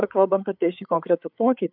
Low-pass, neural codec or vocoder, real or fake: 5.4 kHz; codec, 16 kHz, 4 kbps, FreqCodec, larger model; fake